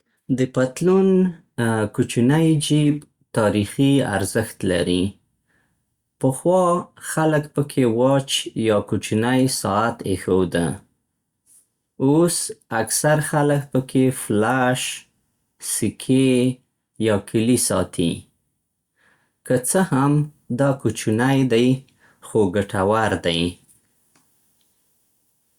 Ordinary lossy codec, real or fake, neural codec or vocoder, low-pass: Opus, 64 kbps; real; none; 19.8 kHz